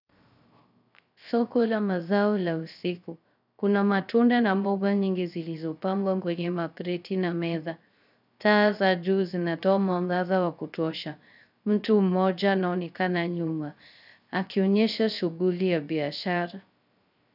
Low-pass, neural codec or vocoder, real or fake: 5.4 kHz; codec, 16 kHz, 0.3 kbps, FocalCodec; fake